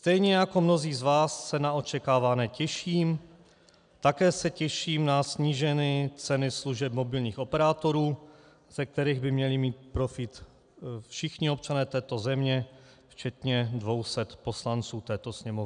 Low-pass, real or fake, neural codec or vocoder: 9.9 kHz; real; none